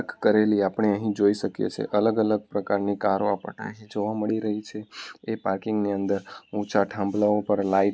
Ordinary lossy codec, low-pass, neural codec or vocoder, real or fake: none; none; none; real